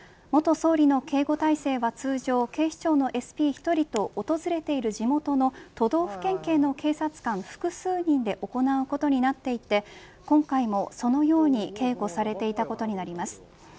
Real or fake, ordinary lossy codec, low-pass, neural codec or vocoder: real; none; none; none